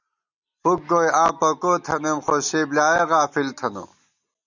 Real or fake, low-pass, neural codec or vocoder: real; 7.2 kHz; none